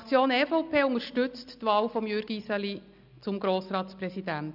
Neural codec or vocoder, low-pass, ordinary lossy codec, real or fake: none; 5.4 kHz; none; real